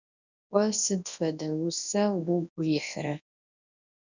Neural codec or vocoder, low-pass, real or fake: codec, 24 kHz, 0.9 kbps, WavTokenizer, large speech release; 7.2 kHz; fake